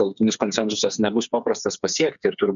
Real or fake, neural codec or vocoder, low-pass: fake; codec, 16 kHz, 4 kbps, FreqCodec, smaller model; 7.2 kHz